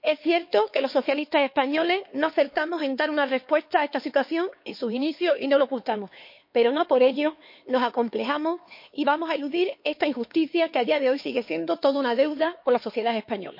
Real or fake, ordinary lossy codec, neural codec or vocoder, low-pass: fake; MP3, 32 kbps; codec, 16 kHz, 4 kbps, X-Codec, HuBERT features, trained on LibriSpeech; 5.4 kHz